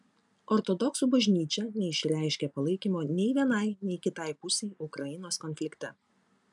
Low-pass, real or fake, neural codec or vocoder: 10.8 kHz; real; none